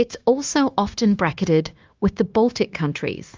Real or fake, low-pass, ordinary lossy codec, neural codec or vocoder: fake; 7.2 kHz; Opus, 32 kbps; codec, 16 kHz, 0.9 kbps, LongCat-Audio-Codec